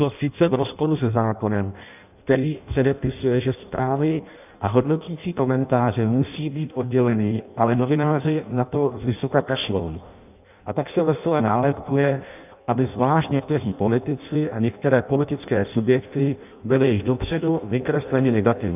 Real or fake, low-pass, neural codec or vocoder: fake; 3.6 kHz; codec, 16 kHz in and 24 kHz out, 0.6 kbps, FireRedTTS-2 codec